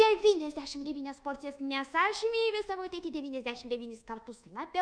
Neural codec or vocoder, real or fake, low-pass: codec, 24 kHz, 1.2 kbps, DualCodec; fake; 9.9 kHz